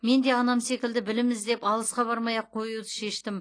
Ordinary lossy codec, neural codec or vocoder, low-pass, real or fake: AAC, 32 kbps; none; 9.9 kHz; real